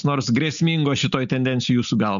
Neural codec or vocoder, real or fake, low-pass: none; real; 7.2 kHz